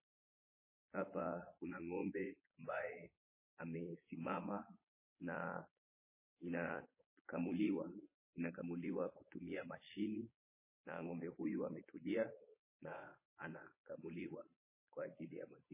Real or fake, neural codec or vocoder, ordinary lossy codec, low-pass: fake; vocoder, 22.05 kHz, 80 mel bands, Vocos; MP3, 16 kbps; 3.6 kHz